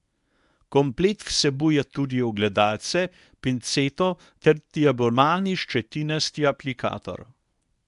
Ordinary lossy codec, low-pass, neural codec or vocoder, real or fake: none; 10.8 kHz; codec, 24 kHz, 0.9 kbps, WavTokenizer, medium speech release version 1; fake